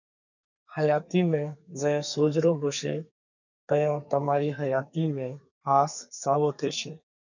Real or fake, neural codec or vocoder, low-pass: fake; codec, 32 kHz, 1.9 kbps, SNAC; 7.2 kHz